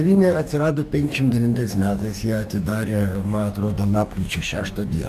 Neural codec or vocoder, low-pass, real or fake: codec, 44.1 kHz, 2.6 kbps, DAC; 14.4 kHz; fake